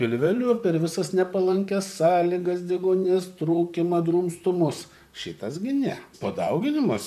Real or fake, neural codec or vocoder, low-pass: fake; vocoder, 44.1 kHz, 128 mel bands, Pupu-Vocoder; 14.4 kHz